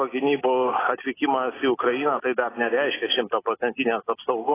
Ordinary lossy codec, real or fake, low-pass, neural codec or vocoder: AAC, 16 kbps; real; 3.6 kHz; none